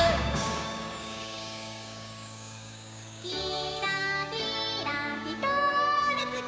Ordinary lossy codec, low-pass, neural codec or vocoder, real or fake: none; none; codec, 16 kHz, 6 kbps, DAC; fake